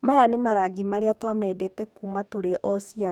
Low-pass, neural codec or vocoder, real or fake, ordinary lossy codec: 19.8 kHz; codec, 44.1 kHz, 2.6 kbps, DAC; fake; none